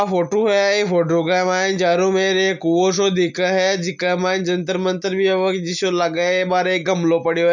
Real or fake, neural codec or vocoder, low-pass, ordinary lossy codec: real; none; 7.2 kHz; none